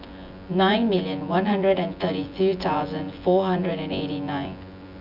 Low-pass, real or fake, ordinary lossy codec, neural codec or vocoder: 5.4 kHz; fake; none; vocoder, 24 kHz, 100 mel bands, Vocos